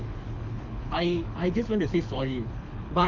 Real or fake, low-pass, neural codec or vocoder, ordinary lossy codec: fake; 7.2 kHz; codec, 24 kHz, 6 kbps, HILCodec; none